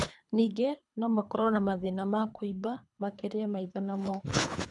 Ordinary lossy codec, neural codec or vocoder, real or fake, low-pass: none; codec, 24 kHz, 3 kbps, HILCodec; fake; 10.8 kHz